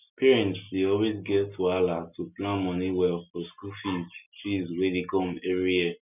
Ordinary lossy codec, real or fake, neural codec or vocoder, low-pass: none; real; none; 3.6 kHz